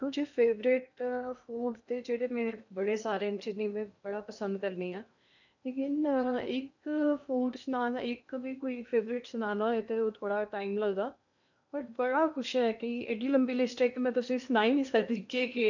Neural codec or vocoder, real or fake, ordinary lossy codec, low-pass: codec, 16 kHz in and 24 kHz out, 0.8 kbps, FocalCodec, streaming, 65536 codes; fake; none; 7.2 kHz